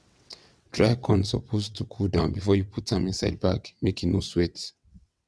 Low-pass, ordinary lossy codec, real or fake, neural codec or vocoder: none; none; fake; vocoder, 22.05 kHz, 80 mel bands, WaveNeXt